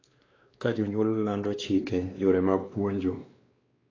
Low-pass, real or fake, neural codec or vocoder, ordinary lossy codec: 7.2 kHz; fake; codec, 16 kHz, 2 kbps, X-Codec, WavLM features, trained on Multilingual LibriSpeech; AAC, 32 kbps